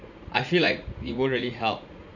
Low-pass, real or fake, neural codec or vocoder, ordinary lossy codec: 7.2 kHz; fake; vocoder, 44.1 kHz, 80 mel bands, Vocos; none